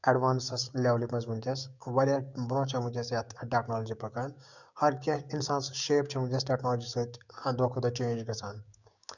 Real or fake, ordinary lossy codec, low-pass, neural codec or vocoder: fake; none; 7.2 kHz; codec, 16 kHz, 6 kbps, DAC